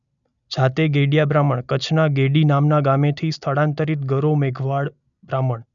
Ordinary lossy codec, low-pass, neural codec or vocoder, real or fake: none; 7.2 kHz; none; real